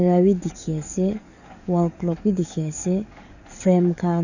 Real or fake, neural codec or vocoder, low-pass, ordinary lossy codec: fake; codec, 44.1 kHz, 7.8 kbps, DAC; 7.2 kHz; none